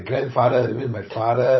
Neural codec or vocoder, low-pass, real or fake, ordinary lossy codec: codec, 16 kHz, 16 kbps, FunCodec, trained on LibriTTS, 50 frames a second; 7.2 kHz; fake; MP3, 24 kbps